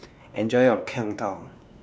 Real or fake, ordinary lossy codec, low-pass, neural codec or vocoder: fake; none; none; codec, 16 kHz, 2 kbps, X-Codec, WavLM features, trained on Multilingual LibriSpeech